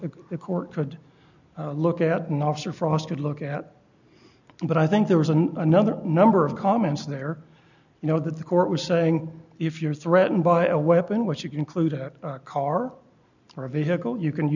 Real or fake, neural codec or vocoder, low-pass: real; none; 7.2 kHz